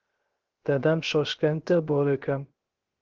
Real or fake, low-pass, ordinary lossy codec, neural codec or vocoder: fake; 7.2 kHz; Opus, 16 kbps; codec, 16 kHz, 0.3 kbps, FocalCodec